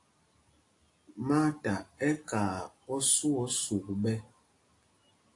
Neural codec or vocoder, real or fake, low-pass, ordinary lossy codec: none; real; 10.8 kHz; AAC, 48 kbps